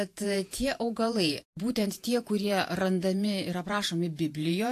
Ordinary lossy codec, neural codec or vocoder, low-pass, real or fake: AAC, 64 kbps; vocoder, 48 kHz, 128 mel bands, Vocos; 14.4 kHz; fake